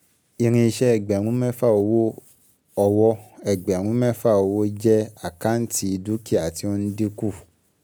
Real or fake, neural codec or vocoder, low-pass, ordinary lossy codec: real; none; none; none